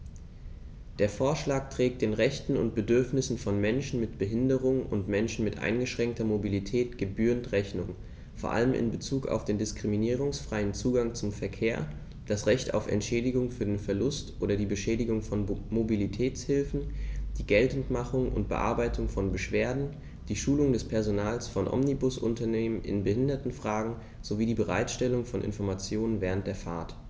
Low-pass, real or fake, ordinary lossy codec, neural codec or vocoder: none; real; none; none